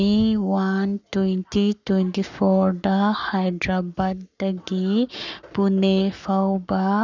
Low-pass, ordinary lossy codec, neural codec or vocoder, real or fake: 7.2 kHz; none; codec, 44.1 kHz, 7.8 kbps, Pupu-Codec; fake